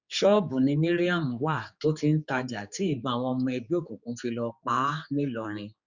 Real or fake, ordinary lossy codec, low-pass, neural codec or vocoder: fake; Opus, 64 kbps; 7.2 kHz; codec, 16 kHz, 4 kbps, X-Codec, HuBERT features, trained on general audio